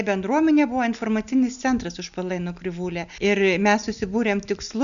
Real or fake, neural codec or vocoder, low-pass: real; none; 7.2 kHz